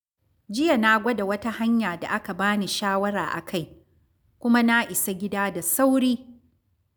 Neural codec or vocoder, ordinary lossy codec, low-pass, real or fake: none; none; none; real